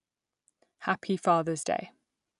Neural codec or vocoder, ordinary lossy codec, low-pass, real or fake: none; none; 10.8 kHz; real